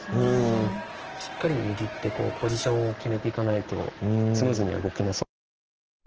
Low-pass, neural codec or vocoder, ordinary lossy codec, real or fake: 7.2 kHz; codec, 44.1 kHz, 7.8 kbps, Pupu-Codec; Opus, 16 kbps; fake